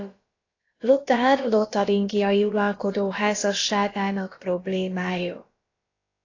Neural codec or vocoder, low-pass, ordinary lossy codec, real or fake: codec, 16 kHz, about 1 kbps, DyCAST, with the encoder's durations; 7.2 kHz; AAC, 32 kbps; fake